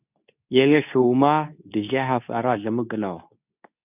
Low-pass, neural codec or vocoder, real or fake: 3.6 kHz; codec, 24 kHz, 0.9 kbps, WavTokenizer, medium speech release version 2; fake